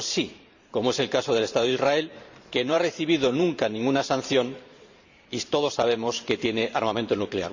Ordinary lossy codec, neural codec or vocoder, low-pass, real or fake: Opus, 64 kbps; vocoder, 44.1 kHz, 128 mel bands every 512 samples, BigVGAN v2; 7.2 kHz; fake